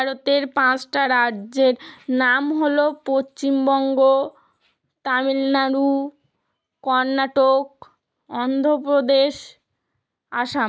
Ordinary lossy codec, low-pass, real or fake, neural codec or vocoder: none; none; real; none